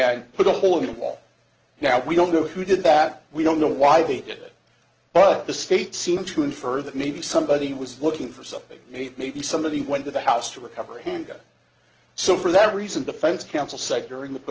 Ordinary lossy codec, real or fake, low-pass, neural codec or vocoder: Opus, 16 kbps; real; 7.2 kHz; none